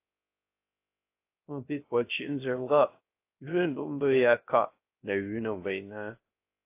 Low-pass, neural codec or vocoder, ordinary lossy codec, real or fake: 3.6 kHz; codec, 16 kHz, 0.3 kbps, FocalCodec; AAC, 24 kbps; fake